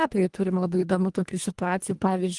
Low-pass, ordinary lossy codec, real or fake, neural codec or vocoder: 10.8 kHz; Opus, 24 kbps; fake; codec, 24 kHz, 1.5 kbps, HILCodec